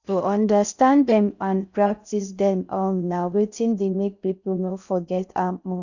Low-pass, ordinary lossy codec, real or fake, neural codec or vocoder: 7.2 kHz; none; fake; codec, 16 kHz in and 24 kHz out, 0.6 kbps, FocalCodec, streaming, 4096 codes